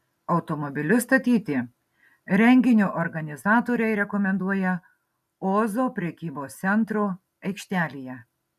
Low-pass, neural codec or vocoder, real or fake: 14.4 kHz; none; real